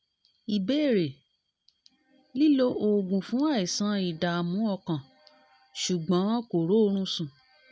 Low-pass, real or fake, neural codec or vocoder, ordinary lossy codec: none; real; none; none